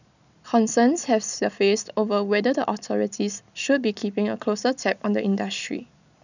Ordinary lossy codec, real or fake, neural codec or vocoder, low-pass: none; real; none; 7.2 kHz